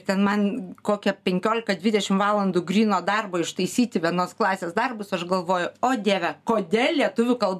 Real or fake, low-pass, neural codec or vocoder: real; 14.4 kHz; none